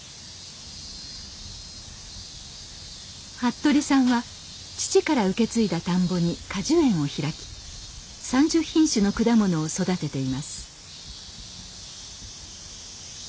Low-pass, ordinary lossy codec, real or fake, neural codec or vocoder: none; none; real; none